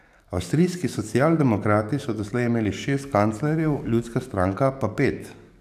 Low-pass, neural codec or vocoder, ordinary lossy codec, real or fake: 14.4 kHz; codec, 44.1 kHz, 7.8 kbps, DAC; none; fake